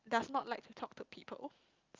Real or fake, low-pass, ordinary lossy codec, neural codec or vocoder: real; 7.2 kHz; Opus, 24 kbps; none